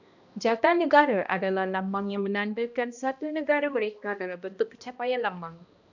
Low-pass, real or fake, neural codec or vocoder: 7.2 kHz; fake; codec, 16 kHz, 1 kbps, X-Codec, HuBERT features, trained on balanced general audio